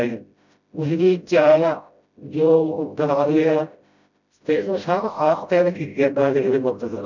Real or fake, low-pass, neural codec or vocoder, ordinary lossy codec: fake; 7.2 kHz; codec, 16 kHz, 0.5 kbps, FreqCodec, smaller model; none